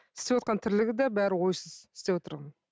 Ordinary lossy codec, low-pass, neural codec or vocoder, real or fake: none; none; none; real